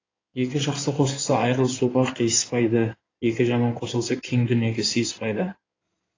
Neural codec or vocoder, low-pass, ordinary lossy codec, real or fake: codec, 16 kHz in and 24 kHz out, 2.2 kbps, FireRedTTS-2 codec; 7.2 kHz; AAC, 32 kbps; fake